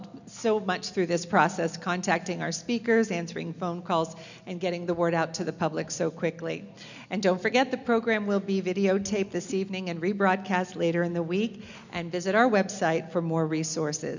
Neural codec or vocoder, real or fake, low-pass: none; real; 7.2 kHz